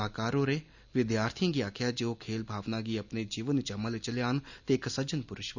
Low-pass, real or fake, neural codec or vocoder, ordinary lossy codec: 7.2 kHz; real; none; none